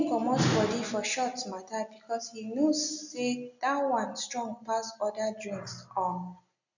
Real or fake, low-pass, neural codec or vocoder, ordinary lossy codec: real; 7.2 kHz; none; none